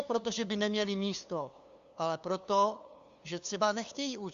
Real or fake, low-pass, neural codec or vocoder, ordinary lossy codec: fake; 7.2 kHz; codec, 16 kHz, 2 kbps, FunCodec, trained on LibriTTS, 25 frames a second; Opus, 64 kbps